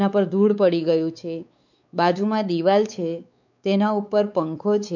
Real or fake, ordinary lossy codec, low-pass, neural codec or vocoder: fake; none; 7.2 kHz; codec, 16 kHz, 4 kbps, X-Codec, WavLM features, trained on Multilingual LibriSpeech